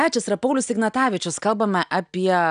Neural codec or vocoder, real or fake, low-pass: none; real; 9.9 kHz